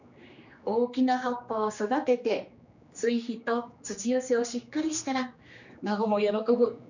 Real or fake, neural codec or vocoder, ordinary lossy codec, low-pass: fake; codec, 16 kHz, 2 kbps, X-Codec, HuBERT features, trained on general audio; none; 7.2 kHz